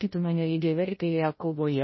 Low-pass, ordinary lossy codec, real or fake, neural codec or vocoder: 7.2 kHz; MP3, 24 kbps; fake; codec, 16 kHz, 0.5 kbps, FreqCodec, larger model